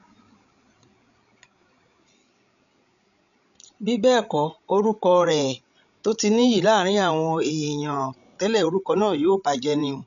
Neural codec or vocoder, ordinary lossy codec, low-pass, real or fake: codec, 16 kHz, 16 kbps, FreqCodec, larger model; none; 7.2 kHz; fake